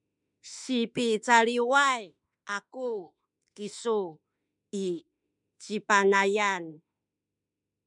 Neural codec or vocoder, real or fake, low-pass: autoencoder, 48 kHz, 32 numbers a frame, DAC-VAE, trained on Japanese speech; fake; 10.8 kHz